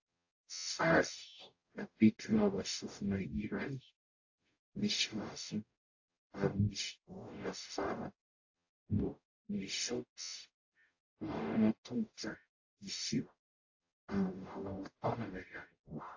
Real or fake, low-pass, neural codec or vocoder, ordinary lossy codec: fake; 7.2 kHz; codec, 44.1 kHz, 0.9 kbps, DAC; AAC, 48 kbps